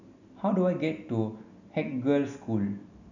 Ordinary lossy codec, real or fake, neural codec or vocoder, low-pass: none; real; none; 7.2 kHz